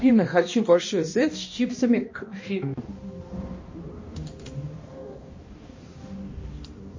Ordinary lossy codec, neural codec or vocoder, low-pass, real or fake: MP3, 32 kbps; codec, 16 kHz, 1 kbps, X-Codec, HuBERT features, trained on balanced general audio; 7.2 kHz; fake